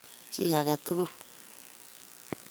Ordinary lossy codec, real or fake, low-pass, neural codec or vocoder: none; fake; none; codec, 44.1 kHz, 2.6 kbps, SNAC